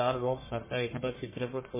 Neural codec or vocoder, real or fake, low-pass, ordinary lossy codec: codec, 44.1 kHz, 1.7 kbps, Pupu-Codec; fake; 3.6 kHz; MP3, 16 kbps